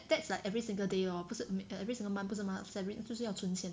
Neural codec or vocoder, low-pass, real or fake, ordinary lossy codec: none; none; real; none